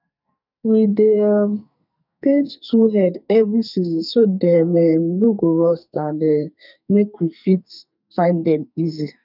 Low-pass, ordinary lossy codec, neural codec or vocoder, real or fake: 5.4 kHz; none; codec, 44.1 kHz, 2.6 kbps, SNAC; fake